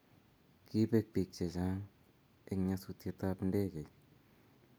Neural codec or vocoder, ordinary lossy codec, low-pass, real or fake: none; none; none; real